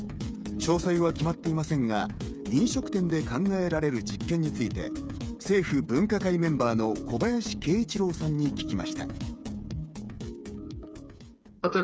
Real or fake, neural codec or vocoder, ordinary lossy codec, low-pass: fake; codec, 16 kHz, 8 kbps, FreqCodec, smaller model; none; none